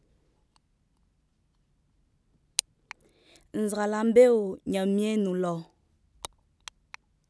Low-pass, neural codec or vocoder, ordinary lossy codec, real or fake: none; none; none; real